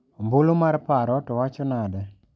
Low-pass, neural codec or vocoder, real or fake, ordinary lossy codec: none; none; real; none